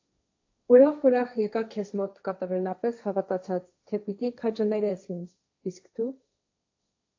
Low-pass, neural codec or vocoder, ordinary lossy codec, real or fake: 7.2 kHz; codec, 16 kHz, 1.1 kbps, Voila-Tokenizer; AAC, 48 kbps; fake